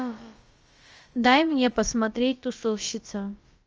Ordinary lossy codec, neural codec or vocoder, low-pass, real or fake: Opus, 24 kbps; codec, 16 kHz, about 1 kbps, DyCAST, with the encoder's durations; 7.2 kHz; fake